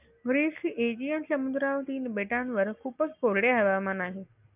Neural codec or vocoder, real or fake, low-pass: none; real; 3.6 kHz